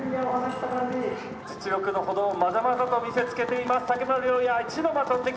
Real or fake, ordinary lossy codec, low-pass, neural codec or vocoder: real; none; none; none